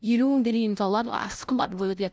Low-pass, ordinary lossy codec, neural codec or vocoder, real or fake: none; none; codec, 16 kHz, 0.5 kbps, FunCodec, trained on LibriTTS, 25 frames a second; fake